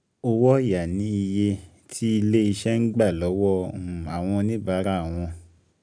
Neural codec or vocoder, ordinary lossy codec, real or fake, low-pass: none; none; real; 9.9 kHz